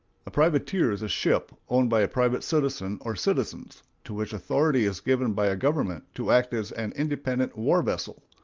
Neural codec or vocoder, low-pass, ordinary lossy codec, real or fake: none; 7.2 kHz; Opus, 24 kbps; real